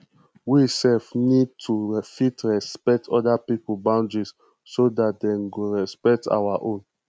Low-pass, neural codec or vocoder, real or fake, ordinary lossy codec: none; none; real; none